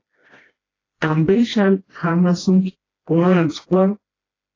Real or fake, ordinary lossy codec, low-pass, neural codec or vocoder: fake; AAC, 32 kbps; 7.2 kHz; codec, 16 kHz, 1 kbps, FreqCodec, smaller model